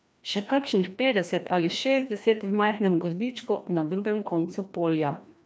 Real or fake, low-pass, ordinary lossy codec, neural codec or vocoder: fake; none; none; codec, 16 kHz, 1 kbps, FreqCodec, larger model